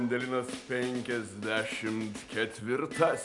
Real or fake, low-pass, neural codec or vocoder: real; 10.8 kHz; none